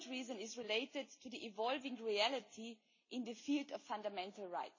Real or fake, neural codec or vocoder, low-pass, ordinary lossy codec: real; none; 7.2 kHz; MP3, 32 kbps